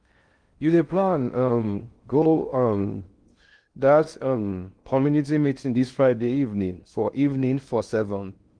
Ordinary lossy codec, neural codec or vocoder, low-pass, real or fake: Opus, 24 kbps; codec, 16 kHz in and 24 kHz out, 0.6 kbps, FocalCodec, streaming, 2048 codes; 9.9 kHz; fake